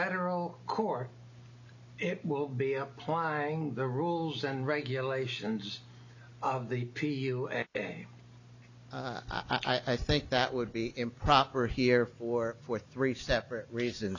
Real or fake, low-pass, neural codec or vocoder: real; 7.2 kHz; none